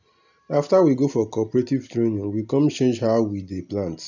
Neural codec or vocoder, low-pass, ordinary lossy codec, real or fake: none; 7.2 kHz; none; real